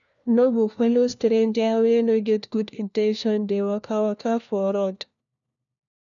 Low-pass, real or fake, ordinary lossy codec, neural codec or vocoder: 7.2 kHz; fake; none; codec, 16 kHz, 1 kbps, FunCodec, trained on LibriTTS, 50 frames a second